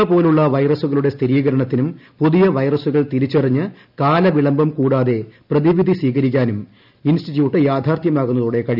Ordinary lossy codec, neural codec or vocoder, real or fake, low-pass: none; none; real; 5.4 kHz